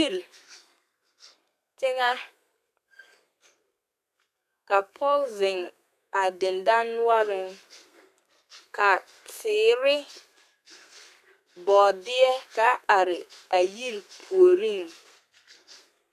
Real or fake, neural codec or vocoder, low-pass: fake; codec, 32 kHz, 1.9 kbps, SNAC; 14.4 kHz